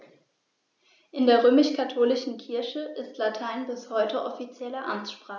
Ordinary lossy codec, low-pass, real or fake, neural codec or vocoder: none; 7.2 kHz; real; none